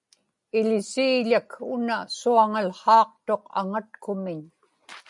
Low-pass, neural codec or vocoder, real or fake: 10.8 kHz; none; real